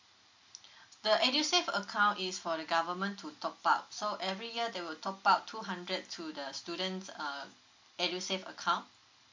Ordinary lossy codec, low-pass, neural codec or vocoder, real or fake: MP3, 48 kbps; 7.2 kHz; none; real